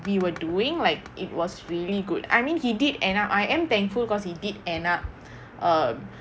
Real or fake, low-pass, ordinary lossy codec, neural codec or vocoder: real; none; none; none